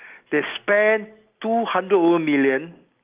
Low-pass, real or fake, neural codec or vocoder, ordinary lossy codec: 3.6 kHz; real; none; Opus, 24 kbps